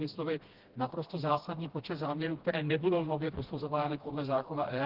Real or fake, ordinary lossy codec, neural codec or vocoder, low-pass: fake; Opus, 16 kbps; codec, 16 kHz, 1 kbps, FreqCodec, smaller model; 5.4 kHz